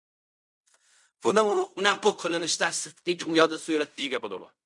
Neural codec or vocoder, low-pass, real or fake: codec, 16 kHz in and 24 kHz out, 0.4 kbps, LongCat-Audio-Codec, fine tuned four codebook decoder; 10.8 kHz; fake